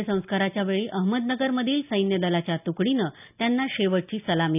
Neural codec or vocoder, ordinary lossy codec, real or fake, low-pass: none; none; real; 3.6 kHz